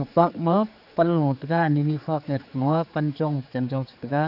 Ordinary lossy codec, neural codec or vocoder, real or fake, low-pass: none; codec, 16 kHz, 4 kbps, X-Codec, HuBERT features, trained on general audio; fake; 5.4 kHz